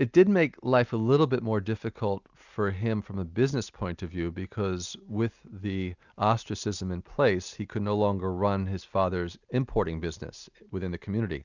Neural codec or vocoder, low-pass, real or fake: none; 7.2 kHz; real